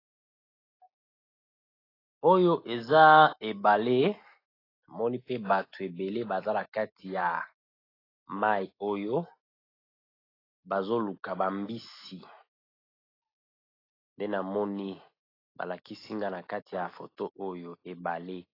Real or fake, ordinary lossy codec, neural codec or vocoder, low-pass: real; AAC, 24 kbps; none; 5.4 kHz